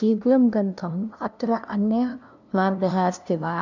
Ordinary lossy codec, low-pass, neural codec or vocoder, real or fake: none; 7.2 kHz; codec, 16 kHz, 0.5 kbps, FunCodec, trained on LibriTTS, 25 frames a second; fake